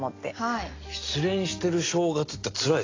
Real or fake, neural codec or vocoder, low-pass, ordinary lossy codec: real; none; 7.2 kHz; AAC, 32 kbps